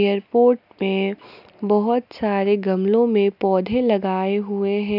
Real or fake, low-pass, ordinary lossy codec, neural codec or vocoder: real; 5.4 kHz; none; none